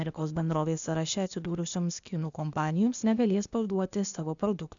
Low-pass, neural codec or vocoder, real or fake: 7.2 kHz; codec, 16 kHz, 0.8 kbps, ZipCodec; fake